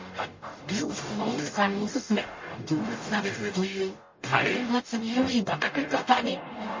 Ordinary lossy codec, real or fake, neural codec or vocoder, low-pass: MP3, 32 kbps; fake; codec, 44.1 kHz, 0.9 kbps, DAC; 7.2 kHz